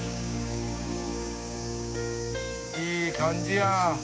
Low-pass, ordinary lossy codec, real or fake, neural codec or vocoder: none; none; fake; codec, 16 kHz, 6 kbps, DAC